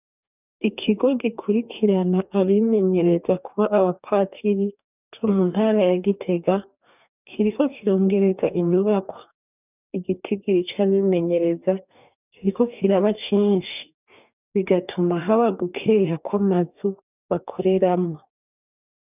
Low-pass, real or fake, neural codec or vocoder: 3.6 kHz; fake; codec, 44.1 kHz, 2.6 kbps, DAC